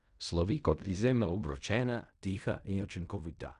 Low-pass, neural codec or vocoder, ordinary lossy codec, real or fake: 10.8 kHz; codec, 16 kHz in and 24 kHz out, 0.4 kbps, LongCat-Audio-Codec, fine tuned four codebook decoder; none; fake